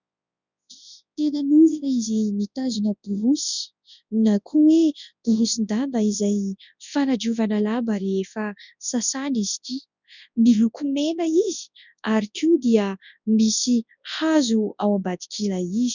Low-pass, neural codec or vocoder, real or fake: 7.2 kHz; codec, 24 kHz, 0.9 kbps, WavTokenizer, large speech release; fake